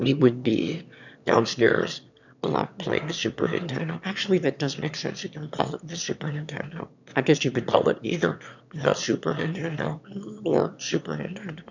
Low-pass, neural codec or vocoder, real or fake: 7.2 kHz; autoencoder, 22.05 kHz, a latent of 192 numbers a frame, VITS, trained on one speaker; fake